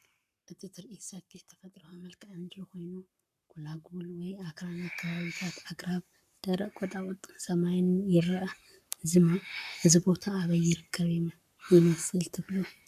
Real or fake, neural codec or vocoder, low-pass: fake; codec, 44.1 kHz, 7.8 kbps, Pupu-Codec; 14.4 kHz